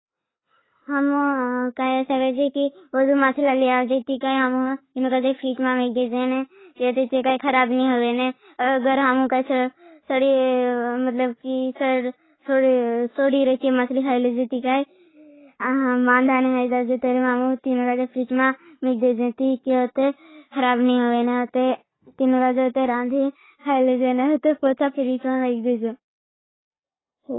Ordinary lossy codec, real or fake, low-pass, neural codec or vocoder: AAC, 16 kbps; real; 7.2 kHz; none